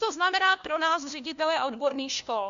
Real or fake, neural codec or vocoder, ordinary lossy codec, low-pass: fake; codec, 16 kHz, 1 kbps, FunCodec, trained on LibriTTS, 50 frames a second; MP3, 64 kbps; 7.2 kHz